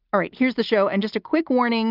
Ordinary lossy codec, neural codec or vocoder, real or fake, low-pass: Opus, 32 kbps; none; real; 5.4 kHz